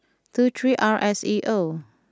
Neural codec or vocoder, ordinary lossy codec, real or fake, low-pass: none; none; real; none